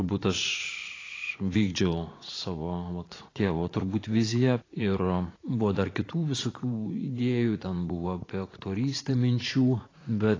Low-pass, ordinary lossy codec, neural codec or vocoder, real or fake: 7.2 kHz; AAC, 32 kbps; vocoder, 44.1 kHz, 80 mel bands, Vocos; fake